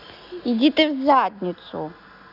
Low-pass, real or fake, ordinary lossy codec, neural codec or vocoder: 5.4 kHz; real; none; none